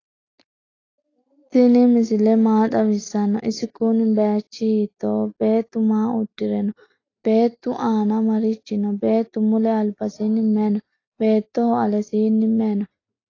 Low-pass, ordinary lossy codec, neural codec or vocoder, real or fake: 7.2 kHz; AAC, 32 kbps; none; real